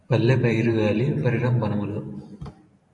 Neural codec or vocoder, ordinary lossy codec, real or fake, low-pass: vocoder, 44.1 kHz, 128 mel bands every 512 samples, BigVGAN v2; AAC, 48 kbps; fake; 10.8 kHz